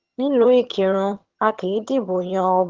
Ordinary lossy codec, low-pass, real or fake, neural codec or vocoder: Opus, 16 kbps; 7.2 kHz; fake; vocoder, 22.05 kHz, 80 mel bands, HiFi-GAN